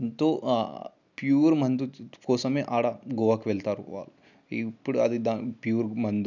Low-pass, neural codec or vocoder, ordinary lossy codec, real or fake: 7.2 kHz; none; none; real